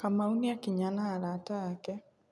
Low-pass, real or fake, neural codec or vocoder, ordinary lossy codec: none; real; none; none